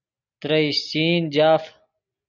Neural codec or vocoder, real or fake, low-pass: none; real; 7.2 kHz